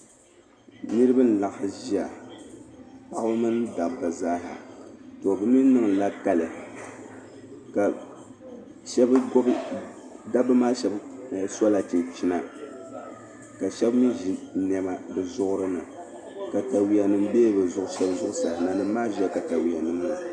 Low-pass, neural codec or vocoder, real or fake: 9.9 kHz; none; real